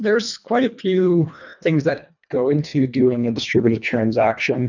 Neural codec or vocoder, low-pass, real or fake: codec, 24 kHz, 1.5 kbps, HILCodec; 7.2 kHz; fake